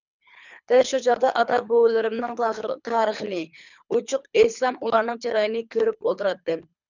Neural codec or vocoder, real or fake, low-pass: codec, 24 kHz, 3 kbps, HILCodec; fake; 7.2 kHz